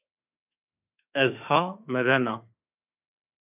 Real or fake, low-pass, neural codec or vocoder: fake; 3.6 kHz; autoencoder, 48 kHz, 32 numbers a frame, DAC-VAE, trained on Japanese speech